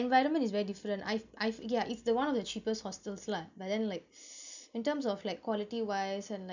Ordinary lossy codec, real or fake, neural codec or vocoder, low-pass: none; real; none; 7.2 kHz